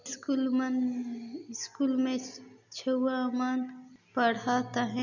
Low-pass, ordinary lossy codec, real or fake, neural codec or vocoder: 7.2 kHz; none; real; none